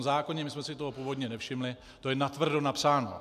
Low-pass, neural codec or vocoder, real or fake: 14.4 kHz; none; real